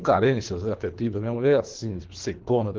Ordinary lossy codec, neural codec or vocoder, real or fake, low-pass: Opus, 16 kbps; codec, 24 kHz, 3 kbps, HILCodec; fake; 7.2 kHz